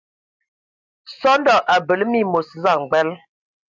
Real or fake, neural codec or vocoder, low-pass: real; none; 7.2 kHz